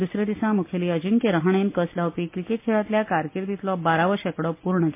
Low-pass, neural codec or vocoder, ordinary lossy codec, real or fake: 3.6 kHz; none; MP3, 24 kbps; real